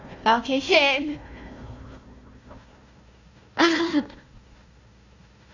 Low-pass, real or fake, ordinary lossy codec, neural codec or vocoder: 7.2 kHz; fake; AAC, 48 kbps; codec, 16 kHz, 1 kbps, FunCodec, trained on Chinese and English, 50 frames a second